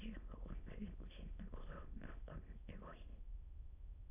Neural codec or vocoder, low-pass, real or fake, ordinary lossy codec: autoencoder, 22.05 kHz, a latent of 192 numbers a frame, VITS, trained on many speakers; 3.6 kHz; fake; AAC, 32 kbps